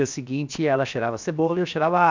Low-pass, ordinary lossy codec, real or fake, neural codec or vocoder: 7.2 kHz; MP3, 64 kbps; fake; codec, 16 kHz, 0.7 kbps, FocalCodec